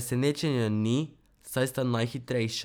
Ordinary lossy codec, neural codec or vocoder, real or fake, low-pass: none; none; real; none